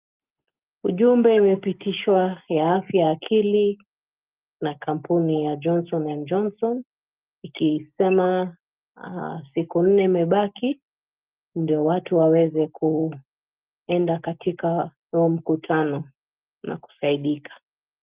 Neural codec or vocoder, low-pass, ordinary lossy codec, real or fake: none; 3.6 kHz; Opus, 24 kbps; real